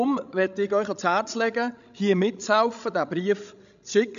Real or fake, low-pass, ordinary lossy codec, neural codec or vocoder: fake; 7.2 kHz; none; codec, 16 kHz, 16 kbps, FreqCodec, larger model